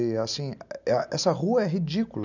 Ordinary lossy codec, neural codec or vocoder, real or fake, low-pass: none; none; real; 7.2 kHz